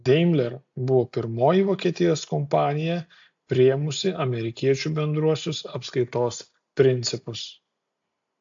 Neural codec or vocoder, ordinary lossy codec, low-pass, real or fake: none; AAC, 64 kbps; 7.2 kHz; real